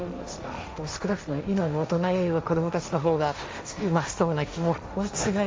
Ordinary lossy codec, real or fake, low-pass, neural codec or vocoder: none; fake; none; codec, 16 kHz, 1.1 kbps, Voila-Tokenizer